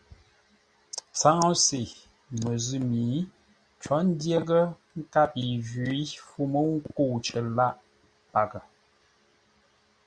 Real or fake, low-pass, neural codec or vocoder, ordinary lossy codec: real; 9.9 kHz; none; Opus, 64 kbps